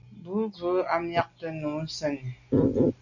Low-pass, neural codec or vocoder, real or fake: 7.2 kHz; none; real